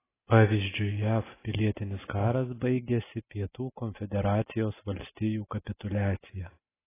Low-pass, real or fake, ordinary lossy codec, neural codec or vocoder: 3.6 kHz; real; AAC, 16 kbps; none